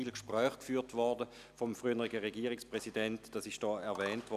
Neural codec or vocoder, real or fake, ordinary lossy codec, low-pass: none; real; none; 14.4 kHz